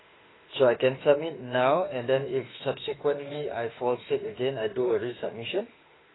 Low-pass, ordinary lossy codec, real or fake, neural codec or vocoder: 7.2 kHz; AAC, 16 kbps; fake; autoencoder, 48 kHz, 32 numbers a frame, DAC-VAE, trained on Japanese speech